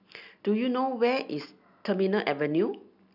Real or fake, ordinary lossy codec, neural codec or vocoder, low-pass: real; none; none; 5.4 kHz